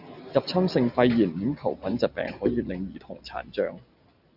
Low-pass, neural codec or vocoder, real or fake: 5.4 kHz; none; real